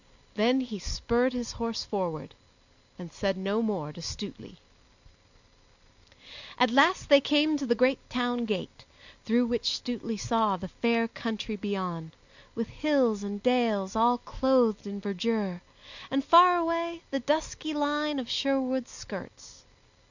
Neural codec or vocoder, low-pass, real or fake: none; 7.2 kHz; real